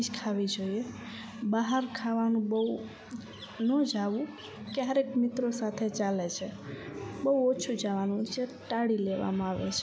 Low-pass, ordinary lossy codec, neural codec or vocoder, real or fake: none; none; none; real